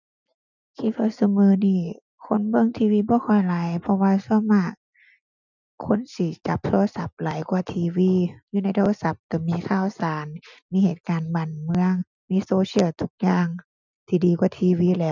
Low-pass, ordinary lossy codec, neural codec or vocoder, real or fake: 7.2 kHz; none; none; real